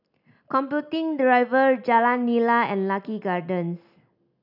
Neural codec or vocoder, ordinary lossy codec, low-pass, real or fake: none; none; 5.4 kHz; real